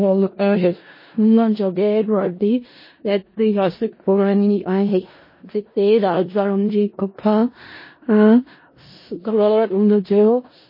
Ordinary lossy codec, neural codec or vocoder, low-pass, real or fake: MP3, 24 kbps; codec, 16 kHz in and 24 kHz out, 0.4 kbps, LongCat-Audio-Codec, four codebook decoder; 5.4 kHz; fake